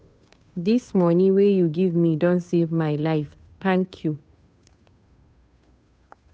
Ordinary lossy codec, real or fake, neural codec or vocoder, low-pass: none; fake; codec, 16 kHz, 2 kbps, FunCodec, trained on Chinese and English, 25 frames a second; none